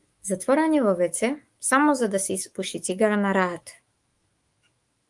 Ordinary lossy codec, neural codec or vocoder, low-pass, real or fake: Opus, 32 kbps; autoencoder, 48 kHz, 128 numbers a frame, DAC-VAE, trained on Japanese speech; 10.8 kHz; fake